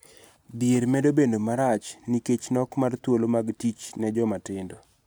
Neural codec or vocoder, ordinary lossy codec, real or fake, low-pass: none; none; real; none